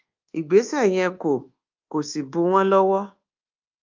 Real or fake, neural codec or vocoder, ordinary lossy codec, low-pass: fake; codec, 24 kHz, 1.2 kbps, DualCodec; Opus, 24 kbps; 7.2 kHz